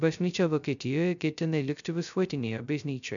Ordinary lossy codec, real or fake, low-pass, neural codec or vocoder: MP3, 96 kbps; fake; 7.2 kHz; codec, 16 kHz, 0.2 kbps, FocalCodec